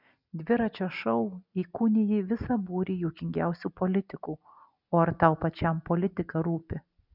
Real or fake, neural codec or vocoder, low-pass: real; none; 5.4 kHz